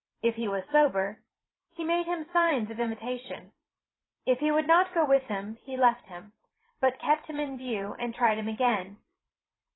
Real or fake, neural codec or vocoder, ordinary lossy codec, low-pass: fake; vocoder, 44.1 kHz, 128 mel bands every 512 samples, BigVGAN v2; AAC, 16 kbps; 7.2 kHz